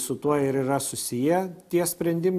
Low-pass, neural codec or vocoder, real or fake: 14.4 kHz; none; real